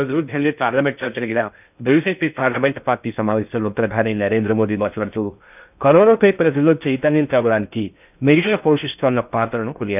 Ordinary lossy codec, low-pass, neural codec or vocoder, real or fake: none; 3.6 kHz; codec, 16 kHz in and 24 kHz out, 0.6 kbps, FocalCodec, streaming, 2048 codes; fake